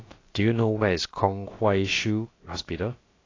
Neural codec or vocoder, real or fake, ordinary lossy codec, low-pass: codec, 16 kHz, about 1 kbps, DyCAST, with the encoder's durations; fake; AAC, 32 kbps; 7.2 kHz